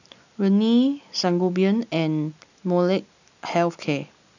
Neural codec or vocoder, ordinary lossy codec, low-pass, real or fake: none; none; 7.2 kHz; real